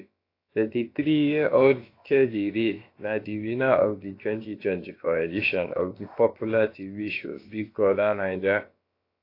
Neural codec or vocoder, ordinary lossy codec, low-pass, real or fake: codec, 16 kHz, about 1 kbps, DyCAST, with the encoder's durations; AAC, 32 kbps; 5.4 kHz; fake